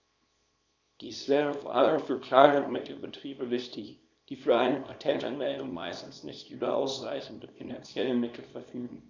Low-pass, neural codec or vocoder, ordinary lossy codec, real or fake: 7.2 kHz; codec, 24 kHz, 0.9 kbps, WavTokenizer, small release; none; fake